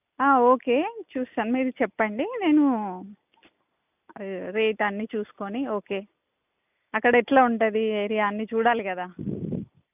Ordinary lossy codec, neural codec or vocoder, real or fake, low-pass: none; none; real; 3.6 kHz